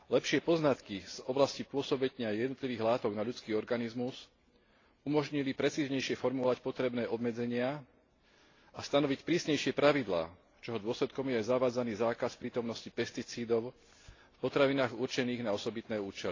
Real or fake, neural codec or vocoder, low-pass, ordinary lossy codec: real; none; 7.2 kHz; AAC, 32 kbps